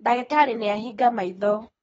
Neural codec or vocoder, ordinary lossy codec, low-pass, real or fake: codec, 24 kHz, 3 kbps, HILCodec; AAC, 24 kbps; 10.8 kHz; fake